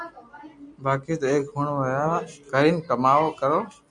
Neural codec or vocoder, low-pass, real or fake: none; 10.8 kHz; real